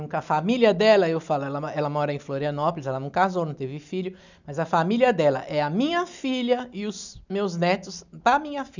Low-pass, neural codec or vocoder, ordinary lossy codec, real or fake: 7.2 kHz; none; none; real